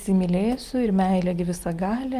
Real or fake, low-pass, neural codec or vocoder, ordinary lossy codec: real; 14.4 kHz; none; Opus, 24 kbps